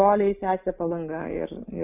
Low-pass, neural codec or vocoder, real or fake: 3.6 kHz; none; real